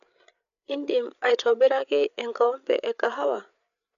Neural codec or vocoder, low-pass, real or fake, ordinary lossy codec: codec, 16 kHz, 8 kbps, FreqCodec, larger model; 7.2 kHz; fake; none